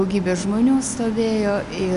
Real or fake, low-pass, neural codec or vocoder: real; 10.8 kHz; none